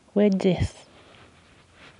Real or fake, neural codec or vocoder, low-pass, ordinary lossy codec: real; none; 10.8 kHz; none